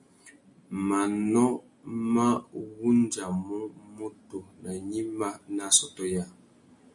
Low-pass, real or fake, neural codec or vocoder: 10.8 kHz; real; none